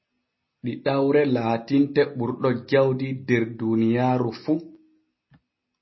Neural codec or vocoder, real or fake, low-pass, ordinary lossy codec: none; real; 7.2 kHz; MP3, 24 kbps